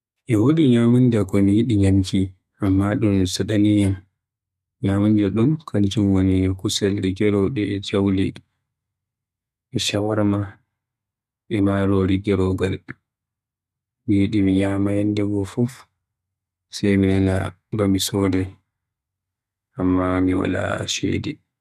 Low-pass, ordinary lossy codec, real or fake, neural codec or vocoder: 14.4 kHz; none; fake; codec, 32 kHz, 1.9 kbps, SNAC